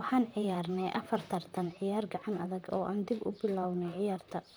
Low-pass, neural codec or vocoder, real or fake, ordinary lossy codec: none; vocoder, 44.1 kHz, 128 mel bands, Pupu-Vocoder; fake; none